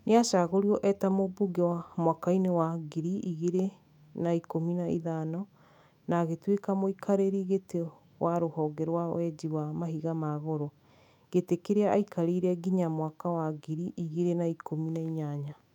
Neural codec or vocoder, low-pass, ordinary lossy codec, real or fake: autoencoder, 48 kHz, 128 numbers a frame, DAC-VAE, trained on Japanese speech; 19.8 kHz; none; fake